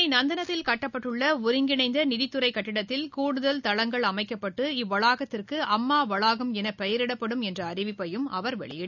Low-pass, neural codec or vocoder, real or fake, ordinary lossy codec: 7.2 kHz; none; real; none